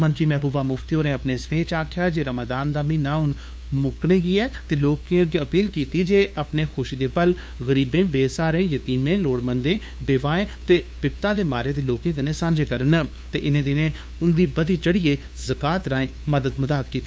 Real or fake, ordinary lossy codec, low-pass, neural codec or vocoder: fake; none; none; codec, 16 kHz, 2 kbps, FunCodec, trained on LibriTTS, 25 frames a second